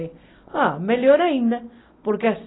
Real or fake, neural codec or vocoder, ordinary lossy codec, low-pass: real; none; AAC, 16 kbps; 7.2 kHz